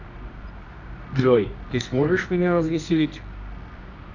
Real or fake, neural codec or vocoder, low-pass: fake; codec, 24 kHz, 0.9 kbps, WavTokenizer, medium music audio release; 7.2 kHz